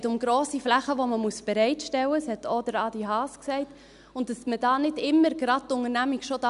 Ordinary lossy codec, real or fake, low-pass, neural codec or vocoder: MP3, 96 kbps; real; 10.8 kHz; none